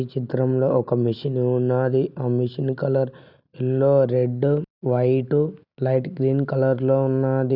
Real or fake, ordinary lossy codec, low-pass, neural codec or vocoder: real; none; 5.4 kHz; none